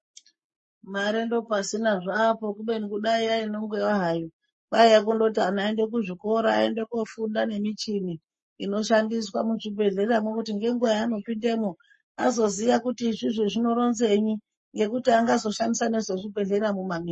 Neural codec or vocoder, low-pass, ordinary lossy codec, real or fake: codec, 44.1 kHz, 7.8 kbps, Pupu-Codec; 10.8 kHz; MP3, 32 kbps; fake